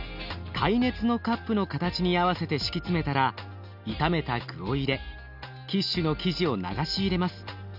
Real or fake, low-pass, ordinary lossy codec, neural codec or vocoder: real; 5.4 kHz; none; none